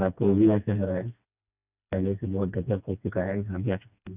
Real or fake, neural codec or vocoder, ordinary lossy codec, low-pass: fake; codec, 16 kHz, 2 kbps, FreqCodec, smaller model; none; 3.6 kHz